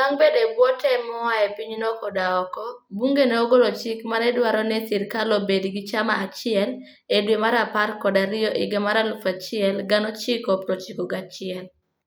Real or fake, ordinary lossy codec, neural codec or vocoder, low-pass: real; none; none; none